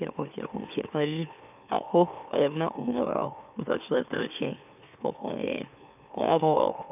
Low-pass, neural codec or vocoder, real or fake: 3.6 kHz; autoencoder, 44.1 kHz, a latent of 192 numbers a frame, MeloTTS; fake